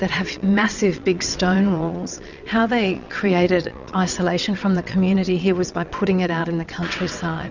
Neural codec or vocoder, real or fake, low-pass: vocoder, 22.05 kHz, 80 mel bands, WaveNeXt; fake; 7.2 kHz